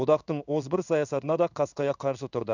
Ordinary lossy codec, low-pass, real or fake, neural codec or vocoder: none; 7.2 kHz; fake; codec, 16 kHz in and 24 kHz out, 1 kbps, XY-Tokenizer